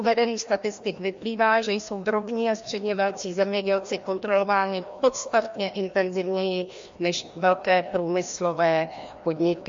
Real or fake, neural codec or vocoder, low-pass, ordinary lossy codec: fake; codec, 16 kHz, 1 kbps, FreqCodec, larger model; 7.2 kHz; MP3, 48 kbps